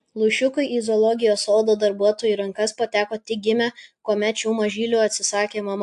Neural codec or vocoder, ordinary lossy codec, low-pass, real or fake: none; AAC, 64 kbps; 10.8 kHz; real